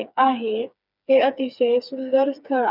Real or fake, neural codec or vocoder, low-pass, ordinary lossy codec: fake; codec, 16 kHz, 4 kbps, FreqCodec, smaller model; 5.4 kHz; none